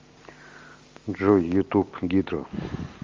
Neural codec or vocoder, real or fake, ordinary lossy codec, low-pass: none; real; Opus, 32 kbps; 7.2 kHz